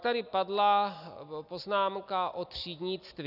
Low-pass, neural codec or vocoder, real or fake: 5.4 kHz; none; real